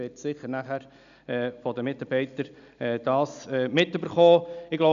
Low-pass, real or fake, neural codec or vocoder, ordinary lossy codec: 7.2 kHz; real; none; none